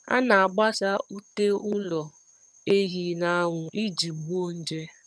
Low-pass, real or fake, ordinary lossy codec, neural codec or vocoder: none; fake; none; vocoder, 22.05 kHz, 80 mel bands, HiFi-GAN